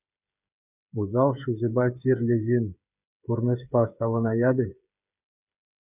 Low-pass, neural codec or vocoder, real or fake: 3.6 kHz; codec, 16 kHz, 8 kbps, FreqCodec, smaller model; fake